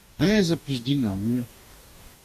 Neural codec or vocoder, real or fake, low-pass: codec, 44.1 kHz, 2.6 kbps, DAC; fake; 14.4 kHz